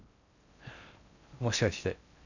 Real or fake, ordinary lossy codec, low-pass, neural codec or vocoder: fake; none; 7.2 kHz; codec, 16 kHz in and 24 kHz out, 0.6 kbps, FocalCodec, streaming, 4096 codes